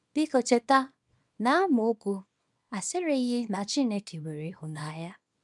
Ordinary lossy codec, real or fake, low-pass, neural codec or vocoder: none; fake; 10.8 kHz; codec, 24 kHz, 0.9 kbps, WavTokenizer, small release